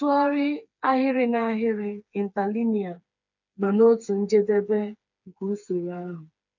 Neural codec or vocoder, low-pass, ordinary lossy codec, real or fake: codec, 16 kHz, 4 kbps, FreqCodec, smaller model; 7.2 kHz; none; fake